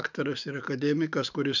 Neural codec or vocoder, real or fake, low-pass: autoencoder, 48 kHz, 128 numbers a frame, DAC-VAE, trained on Japanese speech; fake; 7.2 kHz